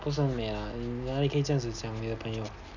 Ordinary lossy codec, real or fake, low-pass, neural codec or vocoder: none; real; 7.2 kHz; none